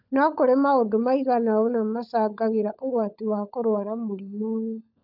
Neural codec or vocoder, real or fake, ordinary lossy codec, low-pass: codec, 16 kHz, 16 kbps, FunCodec, trained on LibriTTS, 50 frames a second; fake; none; 5.4 kHz